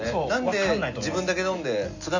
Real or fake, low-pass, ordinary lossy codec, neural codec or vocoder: real; 7.2 kHz; none; none